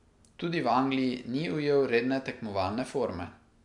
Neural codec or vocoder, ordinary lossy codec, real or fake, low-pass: none; MP3, 64 kbps; real; 10.8 kHz